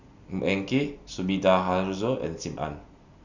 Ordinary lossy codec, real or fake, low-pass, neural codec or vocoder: none; real; 7.2 kHz; none